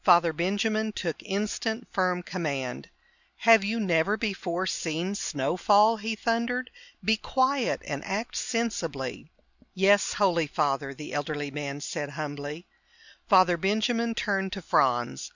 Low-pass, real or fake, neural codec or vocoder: 7.2 kHz; real; none